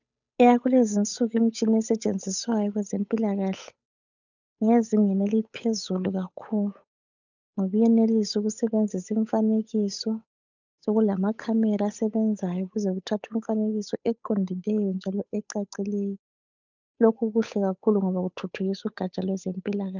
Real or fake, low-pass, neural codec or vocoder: fake; 7.2 kHz; codec, 16 kHz, 8 kbps, FunCodec, trained on Chinese and English, 25 frames a second